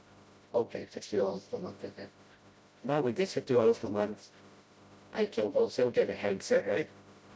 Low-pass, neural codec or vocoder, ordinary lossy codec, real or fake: none; codec, 16 kHz, 0.5 kbps, FreqCodec, smaller model; none; fake